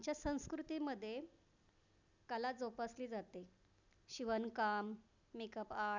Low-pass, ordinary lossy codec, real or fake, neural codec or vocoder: 7.2 kHz; none; real; none